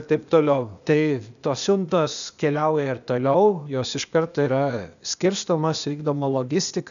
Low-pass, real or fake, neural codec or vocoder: 7.2 kHz; fake; codec, 16 kHz, 0.8 kbps, ZipCodec